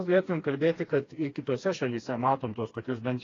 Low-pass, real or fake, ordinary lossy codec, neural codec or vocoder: 7.2 kHz; fake; AAC, 32 kbps; codec, 16 kHz, 2 kbps, FreqCodec, smaller model